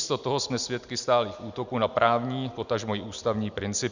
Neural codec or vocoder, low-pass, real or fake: none; 7.2 kHz; real